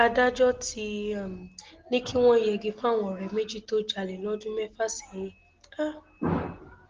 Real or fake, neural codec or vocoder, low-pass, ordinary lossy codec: real; none; 7.2 kHz; Opus, 16 kbps